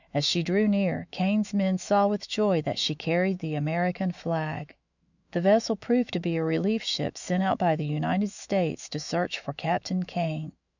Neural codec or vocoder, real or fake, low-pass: autoencoder, 48 kHz, 128 numbers a frame, DAC-VAE, trained on Japanese speech; fake; 7.2 kHz